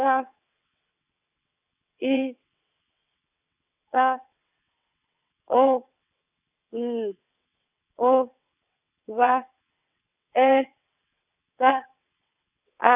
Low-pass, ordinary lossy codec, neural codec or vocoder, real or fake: 3.6 kHz; none; vocoder, 22.05 kHz, 80 mel bands, WaveNeXt; fake